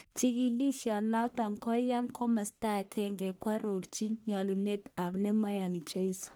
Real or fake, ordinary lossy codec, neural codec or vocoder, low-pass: fake; none; codec, 44.1 kHz, 1.7 kbps, Pupu-Codec; none